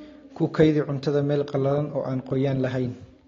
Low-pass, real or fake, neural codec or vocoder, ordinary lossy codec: 7.2 kHz; real; none; AAC, 32 kbps